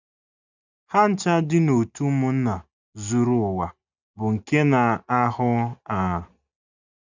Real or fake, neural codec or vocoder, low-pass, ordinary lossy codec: real; none; 7.2 kHz; none